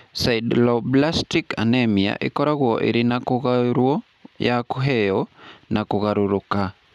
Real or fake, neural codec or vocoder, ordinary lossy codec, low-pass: real; none; none; 14.4 kHz